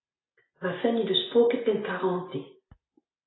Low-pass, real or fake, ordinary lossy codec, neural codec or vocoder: 7.2 kHz; real; AAC, 16 kbps; none